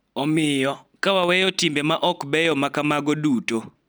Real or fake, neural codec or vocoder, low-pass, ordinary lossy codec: real; none; none; none